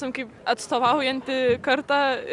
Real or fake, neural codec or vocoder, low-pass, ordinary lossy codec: real; none; 10.8 kHz; Opus, 64 kbps